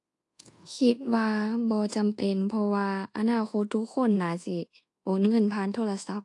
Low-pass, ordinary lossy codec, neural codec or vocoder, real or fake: 10.8 kHz; AAC, 48 kbps; codec, 24 kHz, 1.2 kbps, DualCodec; fake